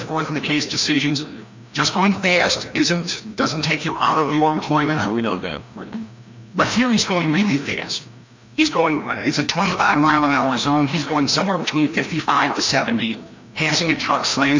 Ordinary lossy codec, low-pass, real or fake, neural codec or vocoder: MP3, 64 kbps; 7.2 kHz; fake; codec, 16 kHz, 1 kbps, FreqCodec, larger model